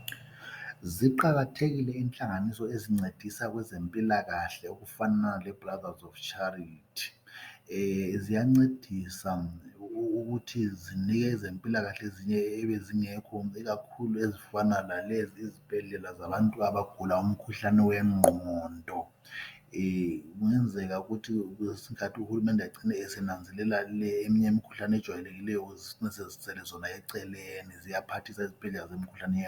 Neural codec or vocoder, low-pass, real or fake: none; 19.8 kHz; real